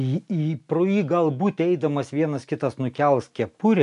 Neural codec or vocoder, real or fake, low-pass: none; real; 10.8 kHz